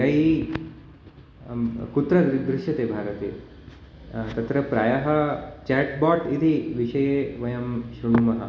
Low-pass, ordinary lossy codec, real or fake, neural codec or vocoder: none; none; real; none